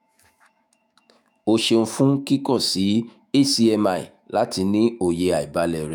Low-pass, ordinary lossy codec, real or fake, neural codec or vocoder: none; none; fake; autoencoder, 48 kHz, 128 numbers a frame, DAC-VAE, trained on Japanese speech